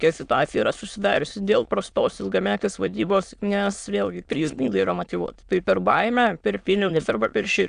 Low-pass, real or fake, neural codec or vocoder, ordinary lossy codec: 9.9 kHz; fake; autoencoder, 22.05 kHz, a latent of 192 numbers a frame, VITS, trained on many speakers; Opus, 64 kbps